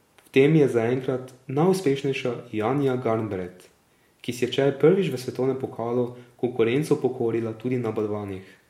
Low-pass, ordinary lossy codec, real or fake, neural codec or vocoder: 19.8 kHz; MP3, 64 kbps; real; none